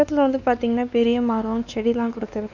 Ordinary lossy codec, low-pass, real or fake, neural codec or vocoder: none; 7.2 kHz; fake; codec, 16 kHz, 2 kbps, X-Codec, WavLM features, trained on Multilingual LibriSpeech